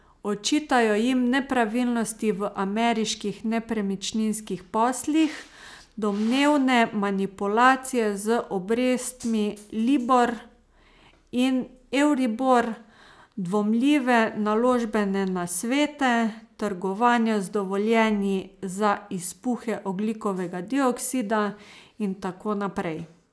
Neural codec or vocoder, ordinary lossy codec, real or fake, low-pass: none; none; real; none